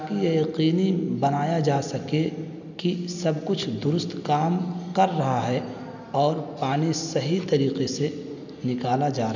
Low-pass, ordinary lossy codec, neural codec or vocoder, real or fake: 7.2 kHz; none; none; real